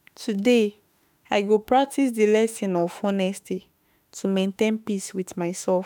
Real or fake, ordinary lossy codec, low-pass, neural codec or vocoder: fake; none; none; autoencoder, 48 kHz, 32 numbers a frame, DAC-VAE, trained on Japanese speech